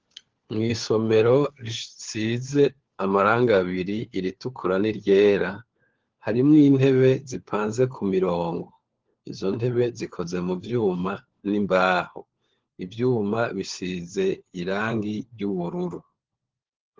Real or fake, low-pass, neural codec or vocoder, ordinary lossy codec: fake; 7.2 kHz; codec, 16 kHz, 4 kbps, FunCodec, trained on LibriTTS, 50 frames a second; Opus, 16 kbps